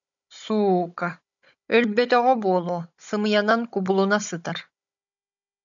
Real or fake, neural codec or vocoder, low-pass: fake; codec, 16 kHz, 16 kbps, FunCodec, trained on Chinese and English, 50 frames a second; 7.2 kHz